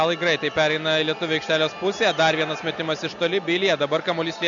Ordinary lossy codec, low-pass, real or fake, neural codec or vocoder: AAC, 64 kbps; 7.2 kHz; real; none